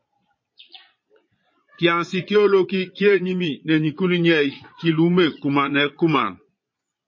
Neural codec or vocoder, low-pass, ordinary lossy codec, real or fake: vocoder, 22.05 kHz, 80 mel bands, Vocos; 7.2 kHz; MP3, 32 kbps; fake